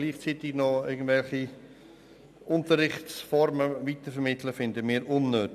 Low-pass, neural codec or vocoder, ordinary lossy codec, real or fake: 14.4 kHz; none; none; real